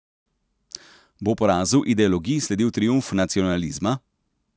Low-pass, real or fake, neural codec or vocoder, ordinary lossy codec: none; real; none; none